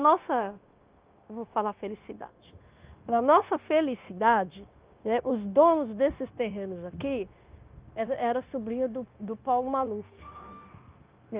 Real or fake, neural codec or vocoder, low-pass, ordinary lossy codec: fake; codec, 16 kHz, 0.9 kbps, LongCat-Audio-Codec; 3.6 kHz; Opus, 32 kbps